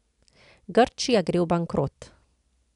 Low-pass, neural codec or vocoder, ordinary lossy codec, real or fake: 10.8 kHz; none; none; real